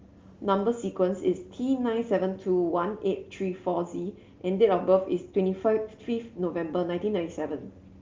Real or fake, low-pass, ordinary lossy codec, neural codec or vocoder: real; 7.2 kHz; Opus, 32 kbps; none